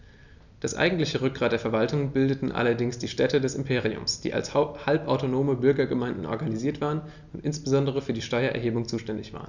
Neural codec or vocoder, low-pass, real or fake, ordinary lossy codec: none; 7.2 kHz; real; none